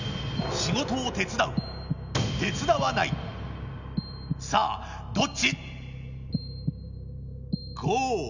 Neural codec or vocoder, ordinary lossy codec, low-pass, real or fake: none; none; 7.2 kHz; real